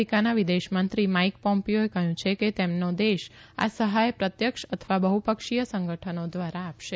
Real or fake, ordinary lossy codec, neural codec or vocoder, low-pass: real; none; none; none